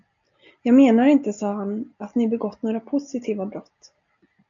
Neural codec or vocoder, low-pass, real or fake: none; 7.2 kHz; real